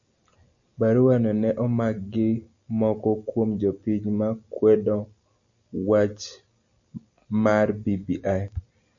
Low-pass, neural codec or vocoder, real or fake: 7.2 kHz; none; real